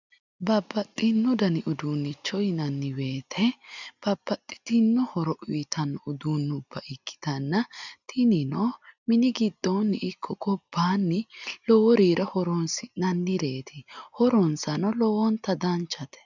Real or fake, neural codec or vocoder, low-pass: real; none; 7.2 kHz